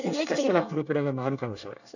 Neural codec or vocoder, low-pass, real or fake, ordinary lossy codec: codec, 24 kHz, 1 kbps, SNAC; 7.2 kHz; fake; none